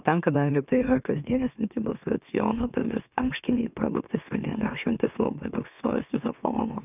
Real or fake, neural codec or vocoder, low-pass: fake; autoencoder, 44.1 kHz, a latent of 192 numbers a frame, MeloTTS; 3.6 kHz